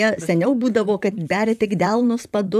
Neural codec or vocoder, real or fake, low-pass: vocoder, 44.1 kHz, 128 mel bands, Pupu-Vocoder; fake; 14.4 kHz